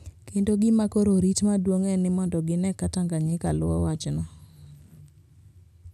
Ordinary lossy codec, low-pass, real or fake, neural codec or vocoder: none; 14.4 kHz; real; none